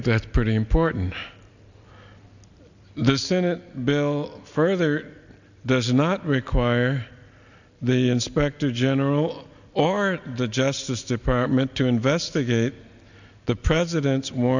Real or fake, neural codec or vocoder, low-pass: real; none; 7.2 kHz